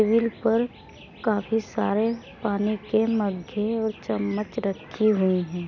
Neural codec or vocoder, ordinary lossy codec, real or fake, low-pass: none; none; real; 7.2 kHz